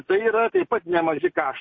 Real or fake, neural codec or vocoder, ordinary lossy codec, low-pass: real; none; MP3, 32 kbps; 7.2 kHz